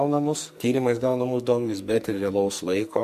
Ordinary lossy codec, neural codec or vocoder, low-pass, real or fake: MP3, 64 kbps; codec, 44.1 kHz, 2.6 kbps, SNAC; 14.4 kHz; fake